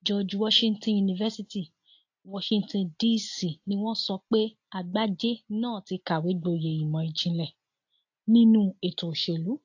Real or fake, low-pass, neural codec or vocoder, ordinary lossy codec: real; 7.2 kHz; none; AAC, 48 kbps